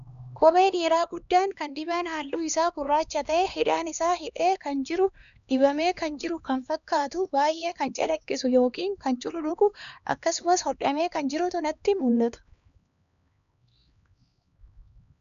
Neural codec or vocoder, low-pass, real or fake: codec, 16 kHz, 2 kbps, X-Codec, HuBERT features, trained on LibriSpeech; 7.2 kHz; fake